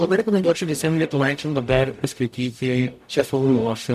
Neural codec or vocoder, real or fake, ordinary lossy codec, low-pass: codec, 44.1 kHz, 0.9 kbps, DAC; fake; MP3, 96 kbps; 14.4 kHz